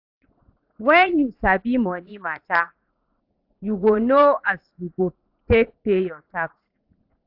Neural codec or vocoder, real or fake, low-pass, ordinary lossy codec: vocoder, 44.1 kHz, 80 mel bands, Vocos; fake; 5.4 kHz; AAC, 48 kbps